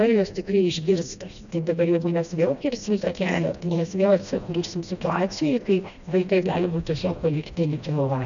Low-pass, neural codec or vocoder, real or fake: 7.2 kHz; codec, 16 kHz, 1 kbps, FreqCodec, smaller model; fake